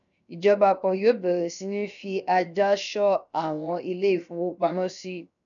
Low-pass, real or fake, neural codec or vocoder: 7.2 kHz; fake; codec, 16 kHz, 0.7 kbps, FocalCodec